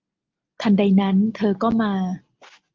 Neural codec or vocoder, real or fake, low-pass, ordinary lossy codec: none; real; 7.2 kHz; Opus, 32 kbps